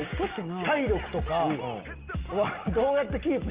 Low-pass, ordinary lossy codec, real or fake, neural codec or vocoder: 3.6 kHz; Opus, 32 kbps; real; none